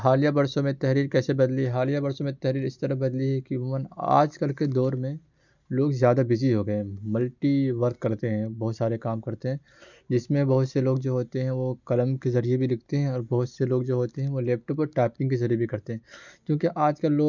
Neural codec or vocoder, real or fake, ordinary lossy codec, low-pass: none; real; none; 7.2 kHz